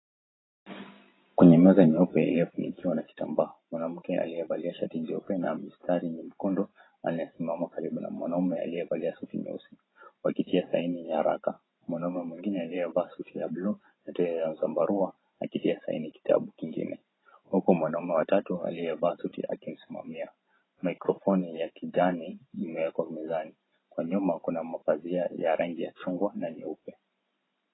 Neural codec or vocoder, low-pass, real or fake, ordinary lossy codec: vocoder, 44.1 kHz, 128 mel bands every 512 samples, BigVGAN v2; 7.2 kHz; fake; AAC, 16 kbps